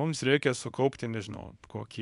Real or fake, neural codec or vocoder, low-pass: fake; codec, 24 kHz, 0.9 kbps, WavTokenizer, small release; 10.8 kHz